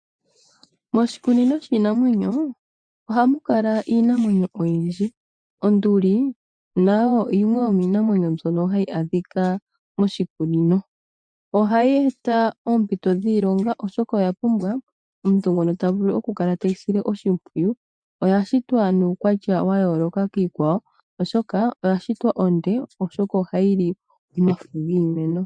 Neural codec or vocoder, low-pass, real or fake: vocoder, 24 kHz, 100 mel bands, Vocos; 9.9 kHz; fake